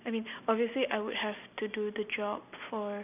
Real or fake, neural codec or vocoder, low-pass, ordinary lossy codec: real; none; 3.6 kHz; none